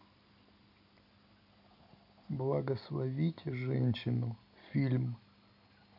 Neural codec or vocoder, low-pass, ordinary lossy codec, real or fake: none; 5.4 kHz; none; real